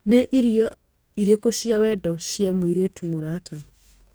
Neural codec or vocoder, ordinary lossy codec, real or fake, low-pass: codec, 44.1 kHz, 2.6 kbps, DAC; none; fake; none